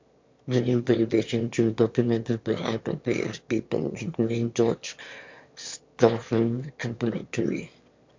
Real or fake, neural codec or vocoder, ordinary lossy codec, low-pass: fake; autoencoder, 22.05 kHz, a latent of 192 numbers a frame, VITS, trained on one speaker; MP3, 48 kbps; 7.2 kHz